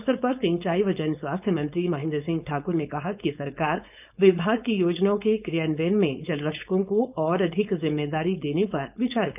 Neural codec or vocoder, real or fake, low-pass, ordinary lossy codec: codec, 16 kHz, 4.8 kbps, FACodec; fake; 3.6 kHz; none